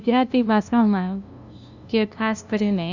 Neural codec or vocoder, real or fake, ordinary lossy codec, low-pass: codec, 16 kHz, 0.5 kbps, FunCodec, trained on LibriTTS, 25 frames a second; fake; none; 7.2 kHz